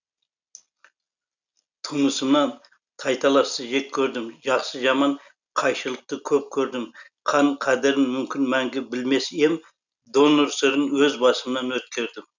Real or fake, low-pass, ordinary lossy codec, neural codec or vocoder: real; 7.2 kHz; none; none